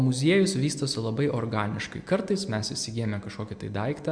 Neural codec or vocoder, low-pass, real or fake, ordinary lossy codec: none; 9.9 kHz; real; MP3, 64 kbps